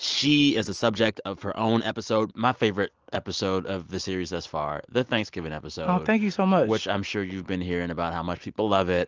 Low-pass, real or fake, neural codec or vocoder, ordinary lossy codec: 7.2 kHz; real; none; Opus, 24 kbps